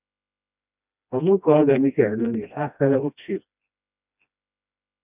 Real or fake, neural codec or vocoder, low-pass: fake; codec, 16 kHz, 1 kbps, FreqCodec, smaller model; 3.6 kHz